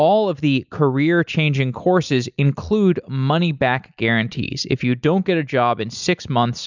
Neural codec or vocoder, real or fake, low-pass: none; real; 7.2 kHz